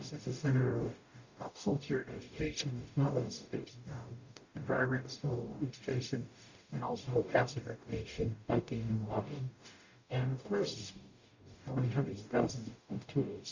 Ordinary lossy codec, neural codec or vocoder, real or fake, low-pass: Opus, 64 kbps; codec, 44.1 kHz, 0.9 kbps, DAC; fake; 7.2 kHz